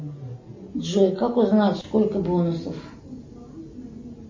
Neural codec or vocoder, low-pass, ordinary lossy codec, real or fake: none; 7.2 kHz; MP3, 32 kbps; real